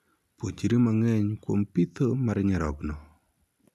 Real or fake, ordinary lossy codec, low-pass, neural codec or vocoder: real; none; 14.4 kHz; none